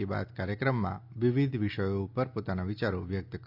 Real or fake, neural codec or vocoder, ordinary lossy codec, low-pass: real; none; none; 5.4 kHz